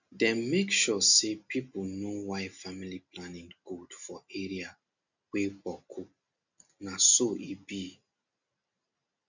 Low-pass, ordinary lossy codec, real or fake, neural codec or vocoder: 7.2 kHz; none; real; none